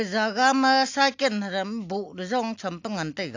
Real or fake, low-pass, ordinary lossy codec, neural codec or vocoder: real; 7.2 kHz; AAC, 48 kbps; none